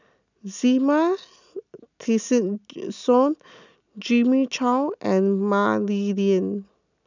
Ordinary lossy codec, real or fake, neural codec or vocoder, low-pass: none; real; none; 7.2 kHz